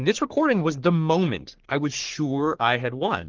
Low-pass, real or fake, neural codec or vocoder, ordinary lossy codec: 7.2 kHz; fake; codec, 44.1 kHz, 3.4 kbps, Pupu-Codec; Opus, 16 kbps